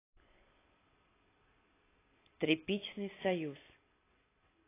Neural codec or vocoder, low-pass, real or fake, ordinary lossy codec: none; 3.6 kHz; real; AAC, 16 kbps